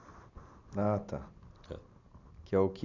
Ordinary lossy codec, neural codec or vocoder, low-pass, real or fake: none; none; 7.2 kHz; real